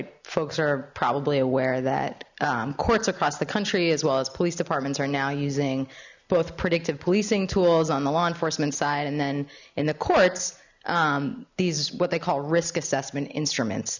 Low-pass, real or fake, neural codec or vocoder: 7.2 kHz; real; none